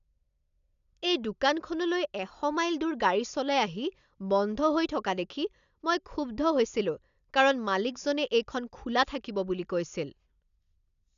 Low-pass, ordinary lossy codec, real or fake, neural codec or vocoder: 7.2 kHz; none; real; none